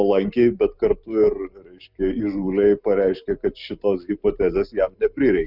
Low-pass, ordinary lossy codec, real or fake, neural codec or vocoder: 5.4 kHz; Opus, 64 kbps; real; none